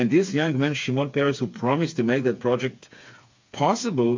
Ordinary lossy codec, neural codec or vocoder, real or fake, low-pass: MP3, 48 kbps; codec, 16 kHz, 4 kbps, FreqCodec, smaller model; fake; 7.2 kHz